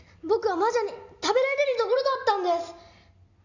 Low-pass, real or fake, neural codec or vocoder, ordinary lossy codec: 7.2 kHz; real; none; none